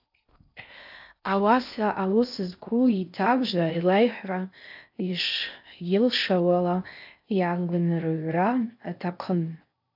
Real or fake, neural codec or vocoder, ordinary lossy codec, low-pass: fake; codec, 16 kHz in and 24 kHz out, 0.6 kbps, FocalCodec, streaming, 4096 codes; AAC, 48 kbps; 5.4 kHz